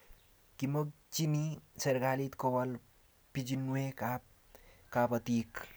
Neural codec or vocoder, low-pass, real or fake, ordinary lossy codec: none; none; real; none